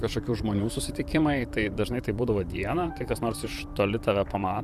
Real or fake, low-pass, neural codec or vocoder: fake; 14.4 kHz; vocoder, 44.1 kHz, 128 mel bands, Pupu-Vocoder